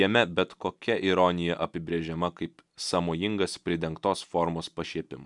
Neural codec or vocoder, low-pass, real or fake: none; 10.8 kHz; real